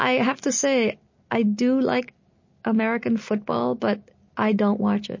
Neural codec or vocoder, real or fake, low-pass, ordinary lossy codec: none; real; 7.2 kHz; MP3, 32 kbps